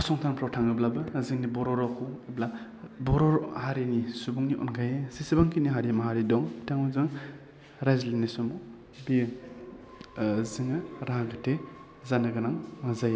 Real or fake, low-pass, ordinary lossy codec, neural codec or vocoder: real; none; none; none